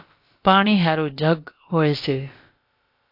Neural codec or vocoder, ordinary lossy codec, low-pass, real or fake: codec, 16 kHz, about 1 kbps, DyCAST, with the encoder's durations; AAC, 32 kbps; 5.4 kHz; fake